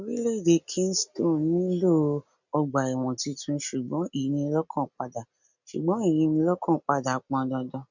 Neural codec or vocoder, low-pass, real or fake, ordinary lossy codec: none; 7.2 kHz; real; none